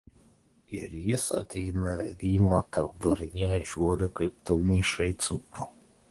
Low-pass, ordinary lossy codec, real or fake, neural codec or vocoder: 10.8 kHz; Opus, 24 kbps; fake; codec, 24 kHz, 1 kbps, SNAC